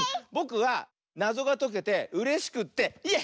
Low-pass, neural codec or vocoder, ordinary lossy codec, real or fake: none; none; none; real